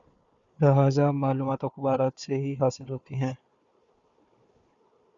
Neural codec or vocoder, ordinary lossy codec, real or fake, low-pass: codec, 16 kHz, 4 kbps, FunCodec, trained on Chinese and English, 50 frames a second; Opus, 64 kbps; fake; 7.2 kHz